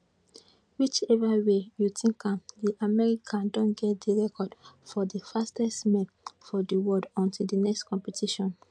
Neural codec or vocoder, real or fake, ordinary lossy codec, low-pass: none; real; MP3, 64 kbps; 9.9 kHz